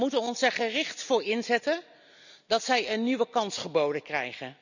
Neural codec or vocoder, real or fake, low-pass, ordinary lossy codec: none; real; 7.2 kHz; none